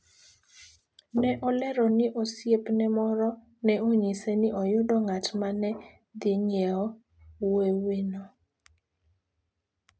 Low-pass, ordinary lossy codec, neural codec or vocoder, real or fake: none; none; none; real